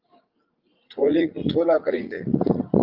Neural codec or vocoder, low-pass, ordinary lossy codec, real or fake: vocoder, 44.1 kHz, 80 mel bands, Vocos; 5.4 kHz; Opus, 24 kbps; fake